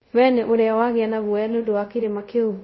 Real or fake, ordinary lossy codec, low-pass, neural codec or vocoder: fake; MP3, 24 kbps; 7.2 kHz; codec, 24 kHz, 0.5 kbps, DualCodec